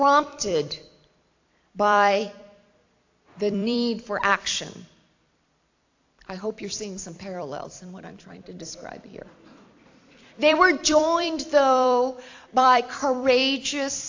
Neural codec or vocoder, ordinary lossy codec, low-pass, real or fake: vocoder, 44.1 kHz, 128 mel bands every 256 samples, BigVGAN v2; AAC, 48 kbps; 7.2 kHz; fake